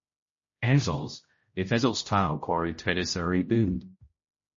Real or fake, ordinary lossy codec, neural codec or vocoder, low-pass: fake; MP3, 32 kbps; codec, 16 kHz, 0.5 kbps, X-Codec, HuBERT features, trained on general audio; 7.2 kHz